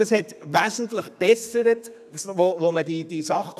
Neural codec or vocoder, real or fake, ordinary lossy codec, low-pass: codec, 32 kHz, 1.9 kbps, SNAC; fake; none; 14.4 kHz